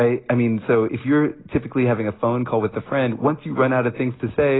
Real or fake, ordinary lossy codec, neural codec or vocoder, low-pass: real; AAC, 16 kbps; none; 7.2 kHz